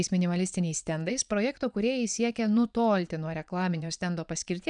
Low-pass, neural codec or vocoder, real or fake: 9.9 kHz; vocoder, 22.05 kHz, 80 mel bands, Vocos; fake